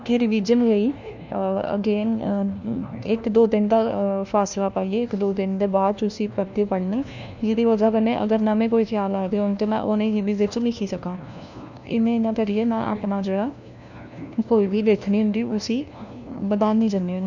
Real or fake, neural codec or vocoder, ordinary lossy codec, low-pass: fake; codec, 16 kHz, 1 kbps, FunCodec, trained on LibriTTS, 50 frames a second; none; 7.2 kHz